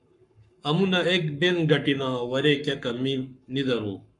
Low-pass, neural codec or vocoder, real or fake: 10.8 kHz; codec, 44.1 kHz, 7.8 kbps, Pupu-Codec; fake